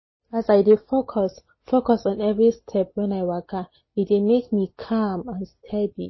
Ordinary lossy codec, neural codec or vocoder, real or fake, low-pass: MP3, 24 kbps; none; real; 7.2 kHz